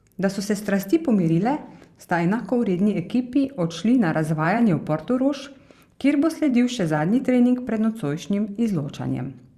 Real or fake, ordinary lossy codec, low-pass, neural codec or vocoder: fake; Opus, 64 kbps; 14.4 kHz; vocoder, 44.1 kHz, 128 mel bands every 512 samples, BigVGAN v2